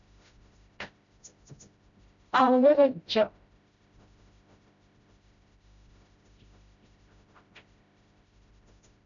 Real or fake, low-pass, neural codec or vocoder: fake; 7.2 kHz; codec, 16 kHz, 0.5 kbps, FreqCodec, smaller model